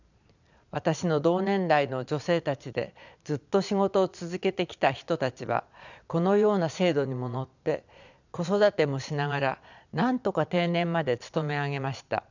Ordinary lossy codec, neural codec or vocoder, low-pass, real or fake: none; vocoder, 22.05 kHz, 80 mel bands, Vocos; 7.2 kHz; fake